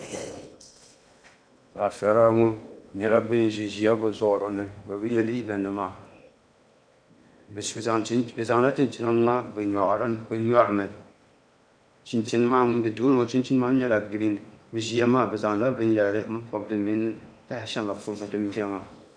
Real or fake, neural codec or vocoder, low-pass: fake; codec, 16 kHz in and 24 kHz out, 0.8 kbps, FocalCodec, streaming, 65536 codes; 9.9 kHz